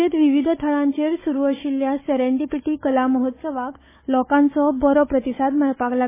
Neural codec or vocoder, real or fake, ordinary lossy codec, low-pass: codec, 16 kHz, 4 kbps, FunCodec, trained on Chinese and English, 50 frames a second; fake; MP3, 16 kbps; 3.6 kHz